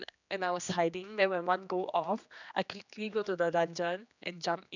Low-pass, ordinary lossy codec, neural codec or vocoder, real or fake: 7.2 kHz; none; codec, 16 kHz, 2 kbps, X-Codec, HuBERT features, trained on general audio; fake